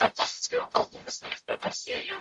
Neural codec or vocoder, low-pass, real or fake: codec, 44.1 kHz, 0.9 kbps, DAC; 10.8 kHz; fake